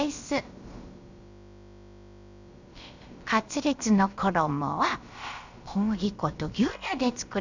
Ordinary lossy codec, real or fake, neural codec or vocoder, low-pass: Opus, 64 kbps; fake; codec, 16 kHz, about 1 kbps, DyCAST, with the encoder's durations; 7.2 kHz